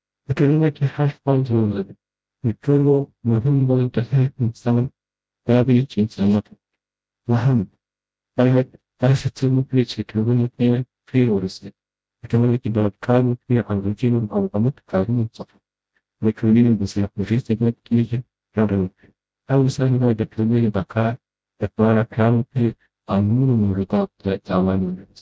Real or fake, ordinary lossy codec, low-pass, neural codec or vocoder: fake; none; none; codec, 16 kHz, 0.5 kbps, FreqCodec, smaller model